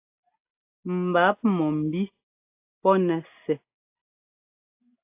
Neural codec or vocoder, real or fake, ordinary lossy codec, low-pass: none; real; AAC, 32 kbps; 3.6 kHz